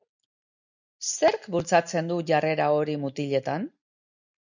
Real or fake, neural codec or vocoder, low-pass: real; none; 7.2 kHz